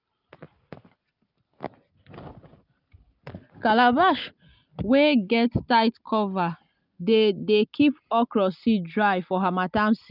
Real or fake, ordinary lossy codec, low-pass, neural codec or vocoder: real; none; 5.4 kHz; none